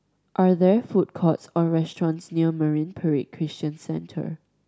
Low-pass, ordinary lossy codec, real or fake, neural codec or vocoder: none; none; real; none